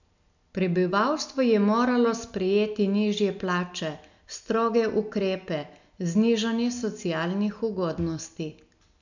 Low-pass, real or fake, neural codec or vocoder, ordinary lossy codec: 7.2 kHz; real; none; none